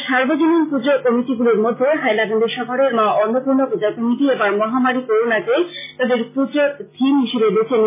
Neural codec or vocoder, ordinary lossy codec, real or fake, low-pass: none; MP3, 16 kbps; real; 3.6 kHz